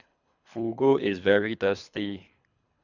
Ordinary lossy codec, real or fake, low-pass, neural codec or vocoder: none; fake; 7.2 kHz; codec, 24 kHz, 3 kbps, HILCodec